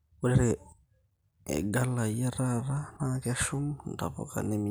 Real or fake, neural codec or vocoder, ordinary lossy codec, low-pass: real; none; none; none